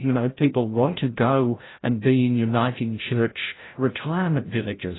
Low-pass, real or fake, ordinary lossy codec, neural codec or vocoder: 7.2 kHz; fake; AAC, 16 kbps; codec, 16 kHz, 0.5 kbps, FreqCodec, larger model